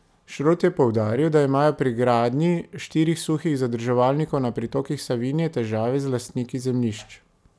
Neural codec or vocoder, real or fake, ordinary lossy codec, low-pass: none; real; none; none